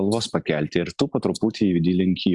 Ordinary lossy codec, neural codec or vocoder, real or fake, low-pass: Opus, 64 kbps; none; real; 10.8 kHz